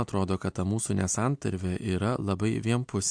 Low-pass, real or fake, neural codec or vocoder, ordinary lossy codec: 9.9 kHz; real; none; MP3, 64 kbps